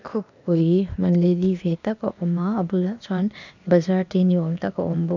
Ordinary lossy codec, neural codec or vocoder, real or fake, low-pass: none; codec, 16 kHz, 0.8 kbps, ZipCodec; fake; 7.2 kHz